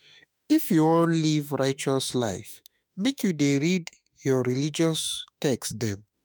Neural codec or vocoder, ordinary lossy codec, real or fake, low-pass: autoencoder, 48 kHz, 32 numbers a frame, DAC-VAE, trained on Japanese speech; none; fake; none